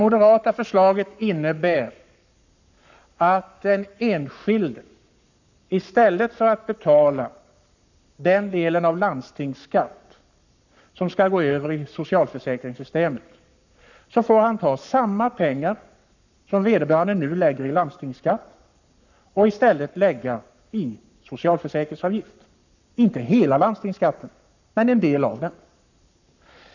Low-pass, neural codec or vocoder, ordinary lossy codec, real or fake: 7.2 kHz; codec, 44.1 kHz, 7.8 kbps, Pupu-Codec; none; fake